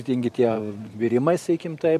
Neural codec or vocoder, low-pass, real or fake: vocoder, 44.1 kHz, 128 mel bands every 512 samples, BigVGAN v2; 14.4 kHz; fake